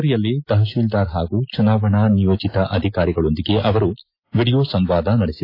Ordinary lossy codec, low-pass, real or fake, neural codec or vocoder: AAC, 32 kbps; 5.4 kHz; real; none